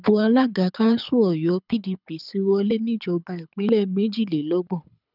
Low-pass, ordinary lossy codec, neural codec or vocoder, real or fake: 5.4 kHz; none; codec, 24 kHz, 6 kbps, HILCodec; fake